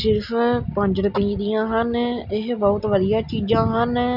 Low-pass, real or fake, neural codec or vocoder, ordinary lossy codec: 5.4 kHz; real; none; none